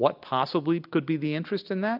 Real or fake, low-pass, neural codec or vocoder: real; 5.4 kHz; none